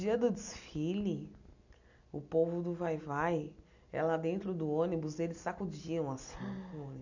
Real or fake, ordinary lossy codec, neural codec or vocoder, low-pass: real; none; none; 7.2 kHz